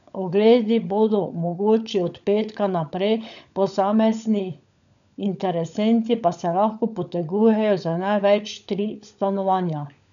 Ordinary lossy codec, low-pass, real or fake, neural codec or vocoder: none; 7.2 kHz; fake; codec, 16 kHz, 16 kbps, FunCodec, trained on LibriTTS, 50 frames a second